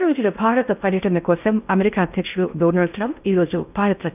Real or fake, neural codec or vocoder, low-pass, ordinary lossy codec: fake; codec, 16 kHz in and 24 kHz out, 0.6 kbps, FocalCodec, streaming, 4096 codes; 3.6 kHz; none